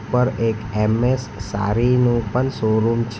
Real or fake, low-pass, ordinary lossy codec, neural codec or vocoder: real; none; none; none